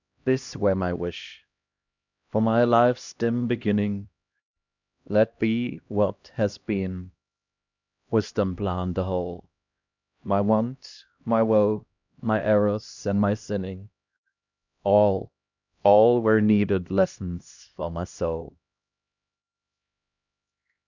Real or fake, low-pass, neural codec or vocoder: fake; 7.2 kHz; codec, 16 kHz, 1 kbps, X-Codec, HuBERT features, trained on LibriSpeech